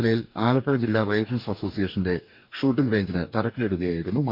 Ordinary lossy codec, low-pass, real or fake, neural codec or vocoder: MP3, 48 kbps; 5.4 kHz; fake; codec, 44.1 kHz, 2.6 kbps, DAC